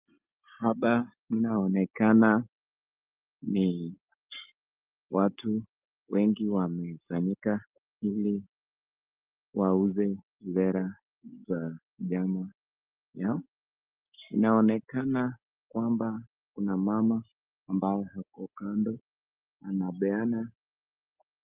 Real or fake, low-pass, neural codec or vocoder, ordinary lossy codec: real; 3.6 kHz; none; Opus, 32 kbps